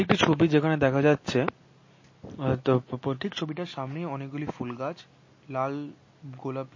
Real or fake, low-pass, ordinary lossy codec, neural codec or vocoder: real; 7.2 kHz; MP3, 32 kbps; none